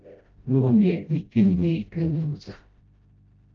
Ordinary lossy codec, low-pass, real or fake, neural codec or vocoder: Opus, 24 kbps; 7.2 kHz; fake; codec, 16 kHz, 0.5 kbps, FreqCodec, smaller model